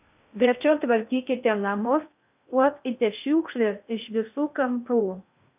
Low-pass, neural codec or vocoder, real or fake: 3.6 kHz; codec, 16 kHz in and 24 kHz out, 0.6 kbps, FocalCodec, streaming, 2048 codes; fake